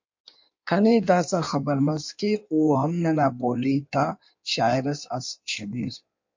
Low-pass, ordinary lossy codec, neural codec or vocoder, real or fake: 7.2 kHz; MP3, 48 kbps; codec, 16 kHz in and 24 kHz out, 1.1 kbps, FireRedTTS-2 codec; fake